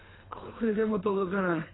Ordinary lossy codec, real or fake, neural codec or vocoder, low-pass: AAC, 16 kbps; fake; codec, 24 kHz, 3 kbps, HILCodec; 7.2 kHz